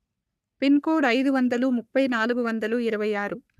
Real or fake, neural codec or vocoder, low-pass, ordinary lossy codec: fake; codec, 44.1 kHz, 3.4 kbps, Pupu-Codec; 14.4 kHz; none